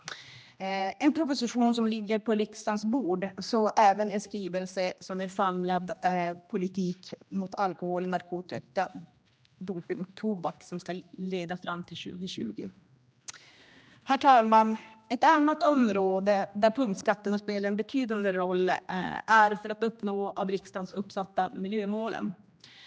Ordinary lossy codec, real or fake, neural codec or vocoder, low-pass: none; fake; codec, 16 kHz, 1 kbps, X-Codec, HuBERT features, trained on general audio; none